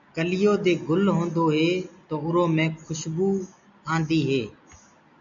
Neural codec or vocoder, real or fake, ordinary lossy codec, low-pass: none; real; MP3, 64 kbps; 7.2 kHz